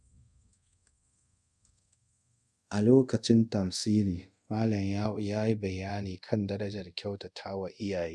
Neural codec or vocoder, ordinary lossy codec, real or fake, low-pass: codec, 24 kHz, 0.5 kbps, DualCodec; none; fake; none